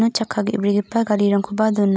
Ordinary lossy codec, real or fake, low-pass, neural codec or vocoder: none; real; none; none